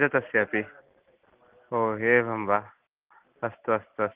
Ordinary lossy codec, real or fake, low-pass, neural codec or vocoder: Opus, 32 kbps; real; 3.6 kHz; none